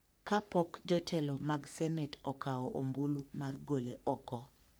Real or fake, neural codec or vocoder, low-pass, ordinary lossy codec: fake; codec, 44.1 kHz, 3.4 kbps, Pupu-Codec; none; none